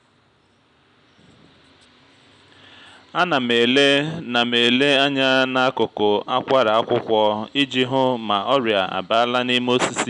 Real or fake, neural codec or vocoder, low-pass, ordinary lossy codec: real; none; 9.9 kHz; none